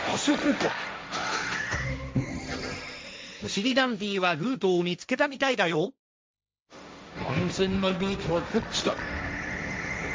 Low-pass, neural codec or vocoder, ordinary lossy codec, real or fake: none; codec, 16 kHz, 1.1 kbps, Voila-Tokenizer; none; fake